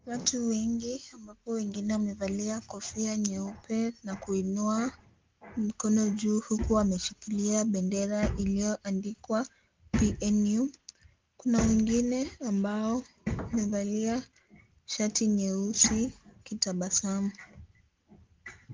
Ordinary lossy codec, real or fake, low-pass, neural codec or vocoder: Opus, 32 kbps; real; 7.2 kHz; none